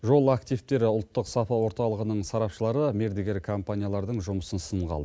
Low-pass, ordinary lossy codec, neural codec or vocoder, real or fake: none; none; none; real